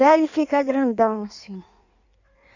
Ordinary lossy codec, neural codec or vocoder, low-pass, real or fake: none; codec, 16 kHz in and 24 kHz out, 1.1 kbps, FireRedTTS-2 codec; 7.2 kHz; fake